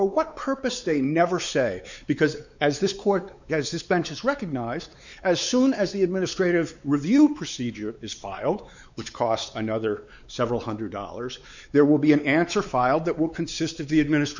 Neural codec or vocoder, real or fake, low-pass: codec, 16 kHz, 4 kbps, X-Codec, WavLM features, trained on Multilingual LibriSpeech; fake; 7.2 kHz